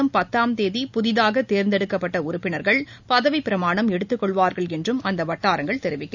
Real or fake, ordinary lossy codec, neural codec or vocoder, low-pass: real; none; none; 7.2 kHz